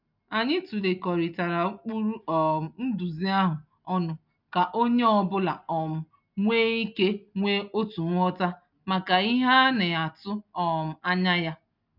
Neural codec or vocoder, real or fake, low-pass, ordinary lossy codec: none; real; 5.4 kHz; none